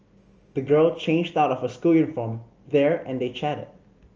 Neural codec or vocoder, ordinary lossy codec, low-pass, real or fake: none; Opus, 24 kbps; 7.2 kHz; real